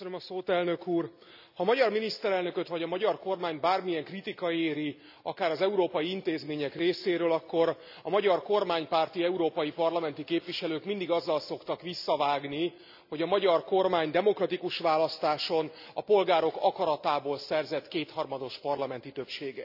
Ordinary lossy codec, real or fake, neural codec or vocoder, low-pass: none; real; none; 5.4 kHz